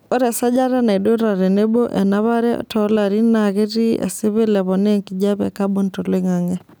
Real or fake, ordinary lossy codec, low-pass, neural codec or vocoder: real; none; none; none